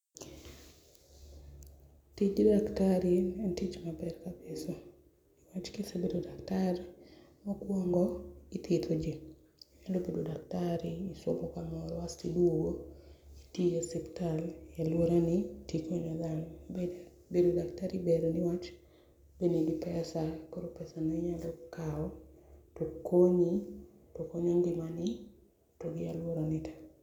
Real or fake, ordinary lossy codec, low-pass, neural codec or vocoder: real; none; 19.8 kHz; none